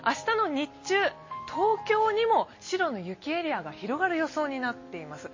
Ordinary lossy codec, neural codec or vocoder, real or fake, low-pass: MP3, 32 kbps; none; real; 7.2 kHz